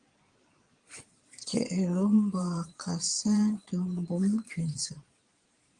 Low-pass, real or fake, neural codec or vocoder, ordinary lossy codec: 9.9 kHz; fake; vocoder, 22.05 kHz, 80 mel bands, Vocos; Opus, 24 kbps